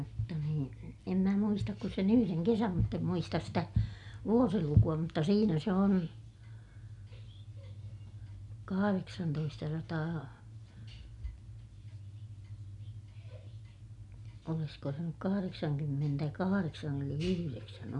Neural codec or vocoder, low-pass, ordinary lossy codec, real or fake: none; 10.8 kHz; none; real